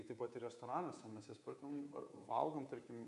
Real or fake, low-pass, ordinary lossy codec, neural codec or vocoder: fake; 10.8 kHz; MP3, 64 kbps; codec, 24 kHz, 3.1 kbps, DualCodec